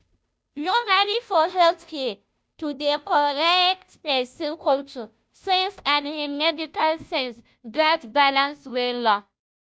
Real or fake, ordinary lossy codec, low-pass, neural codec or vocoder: fake; none; none; codec, 16 kHz, 0.5 kbps, FunCodec, trained on Chinese and English, 25 frames a second